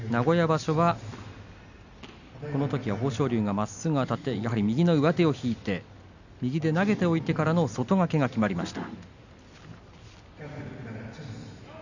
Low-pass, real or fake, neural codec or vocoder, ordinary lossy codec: 7.2 kHz; real; none; none